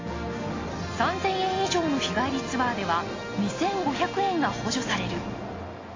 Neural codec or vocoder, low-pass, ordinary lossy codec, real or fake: none; 7.2 kHz; AAC, 32 kbps; real